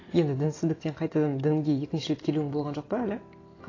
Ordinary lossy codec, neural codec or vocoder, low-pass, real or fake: AAC, 32 kbps; none; 7.2 kHz; real